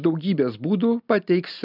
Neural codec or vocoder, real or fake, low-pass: none; real; 5.4 kHz